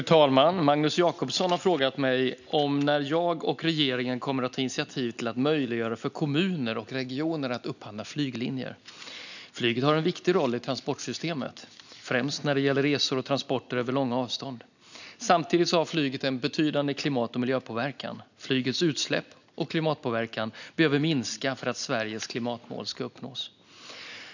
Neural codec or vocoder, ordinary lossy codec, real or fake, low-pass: none; none; real; 7.2 kHz